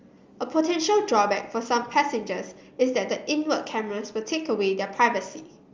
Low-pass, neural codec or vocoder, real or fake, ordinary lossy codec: 7.2 kHz; none; real; Opus, 32 kbps